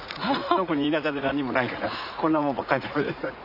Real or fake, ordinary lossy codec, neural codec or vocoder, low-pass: fake; none; vocoder, 44.1 kHz, 80 mel bands, Vocos; 5.4 kHz